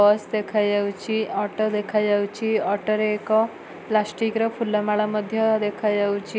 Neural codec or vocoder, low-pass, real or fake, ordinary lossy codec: none; none; real; none